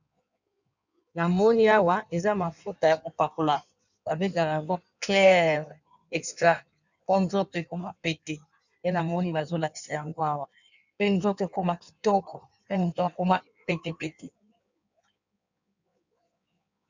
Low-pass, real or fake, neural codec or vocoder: 7.2 kHz; fake; codec, 16 kHz in and 24 kHz out, 1.1 kbps, FireRedTTS-2 codec